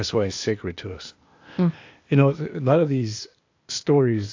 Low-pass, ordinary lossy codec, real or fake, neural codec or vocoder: 7.2 kHz; AAC, 48 kbps; fake; codec, 16 kHz, 0.8 kbps, ZipCodec